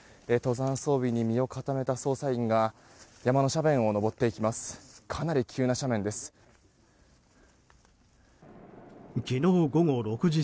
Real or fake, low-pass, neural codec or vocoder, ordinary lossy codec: real; none; none; none